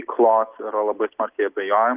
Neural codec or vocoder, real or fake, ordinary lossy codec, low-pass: none; real; Opus, 24 kbps; 3.6 kHz